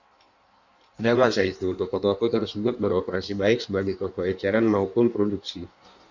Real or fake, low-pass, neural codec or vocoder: fake; 7.2 kHz; codec, 16 kHz in and 24 kHz out, 1.1 kbps, FireRedTTS-2 codec